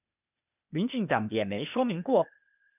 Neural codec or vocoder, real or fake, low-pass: codec, 16 kHz, 0.8 kbps, ZipCodec; fake; 3.6 kHz